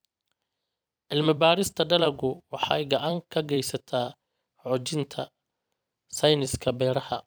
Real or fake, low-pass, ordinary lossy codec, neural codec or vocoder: fake; none; none; vocoder, 44.1 kHz, 128 mel bands every 256 samples, BigVGAN v2